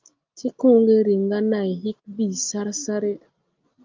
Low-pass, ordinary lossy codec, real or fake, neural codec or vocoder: 7.2 kHz; Opus, 24 kbps; real; none